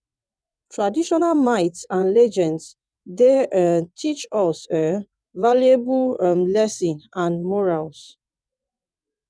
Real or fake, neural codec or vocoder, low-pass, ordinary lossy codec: fake; vocoder, 22.05 kHz, 80 mel bands, WaveNeXt; none; none